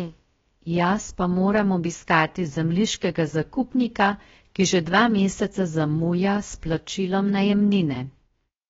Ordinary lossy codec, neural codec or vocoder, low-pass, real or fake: AAC, 24 kbps; codec, 16 kHz, about 1 kbps, DyCAST, with the encoder's durations; 7.2 kHz; fake